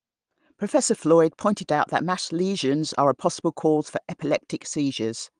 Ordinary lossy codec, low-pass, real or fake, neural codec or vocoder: Opus, 24 kbps; 9.9 kHz; real; none